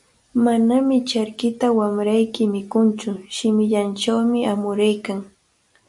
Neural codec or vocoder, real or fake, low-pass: none; real; 10.8 kHz